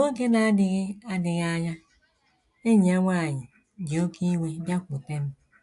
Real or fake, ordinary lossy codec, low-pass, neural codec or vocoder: real; AAC, 64 kbps; 10.8 kHz; none